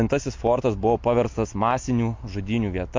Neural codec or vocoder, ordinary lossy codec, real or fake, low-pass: none; MP3, 48 kbps; real; 7.2 kHz